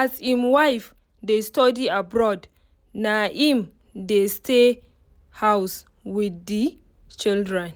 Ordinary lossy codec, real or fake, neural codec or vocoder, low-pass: none; real; none; none